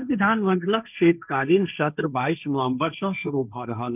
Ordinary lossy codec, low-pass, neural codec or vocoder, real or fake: none; 3.6 kHz; codec, 16 kHz, 1.1 kbps, Voila-Tokenizer; fake